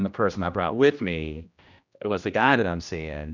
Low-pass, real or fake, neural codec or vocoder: 7.2 kHz; fake; codec, 16 kHz, 1 kbps, X-Codec, HuBERT features, trained on general audio